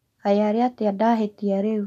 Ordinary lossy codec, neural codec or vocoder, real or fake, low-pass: none; none; real; 14.4 kHz